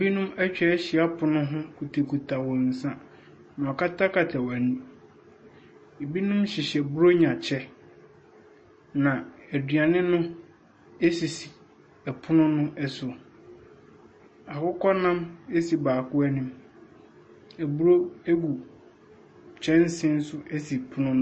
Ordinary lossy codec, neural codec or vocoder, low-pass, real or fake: MP3, 32 kbps; none; 9.9 kHz; real